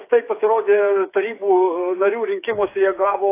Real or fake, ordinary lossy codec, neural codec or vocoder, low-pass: fake; AAC, 24 kbps; codec, 16 kHz, 8 kbps, FreqCodec, smaller model; 3.6 kHz